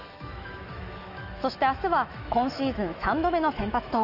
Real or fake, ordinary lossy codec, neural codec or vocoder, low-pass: fake; none; autoencoder, 48 kHz, 128 numbers a frame, DAC-VAE, trained on Japanese speech; 5.4 kHz